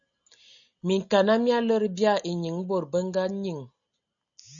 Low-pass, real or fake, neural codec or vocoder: 7.2 kHz; real; none